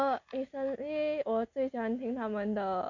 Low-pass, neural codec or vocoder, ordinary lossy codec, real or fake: 7.2 kHz; none; none; real